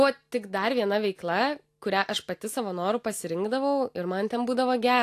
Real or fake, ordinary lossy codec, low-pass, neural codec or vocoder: real; AAC, 64 kbps; 14.4 kHz; none